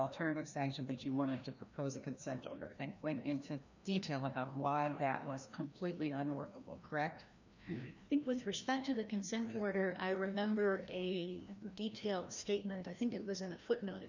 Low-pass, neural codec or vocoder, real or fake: 7.2 kHz; codec, 16 kHz, 1 kbps, FreqCodec, larger model; fake